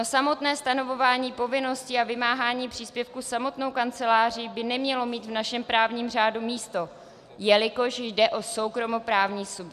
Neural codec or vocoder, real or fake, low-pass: none; real; 14.4 kHz